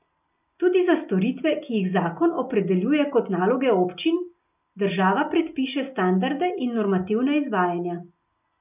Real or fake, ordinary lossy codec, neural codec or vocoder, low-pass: real; none; none; 3.6 kHz